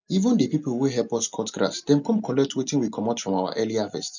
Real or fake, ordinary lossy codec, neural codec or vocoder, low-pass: real; none; none; 7.2 kHz